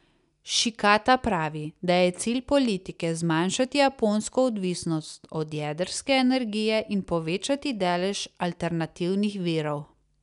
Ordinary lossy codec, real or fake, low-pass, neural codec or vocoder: none; real; 10.8 kHz; none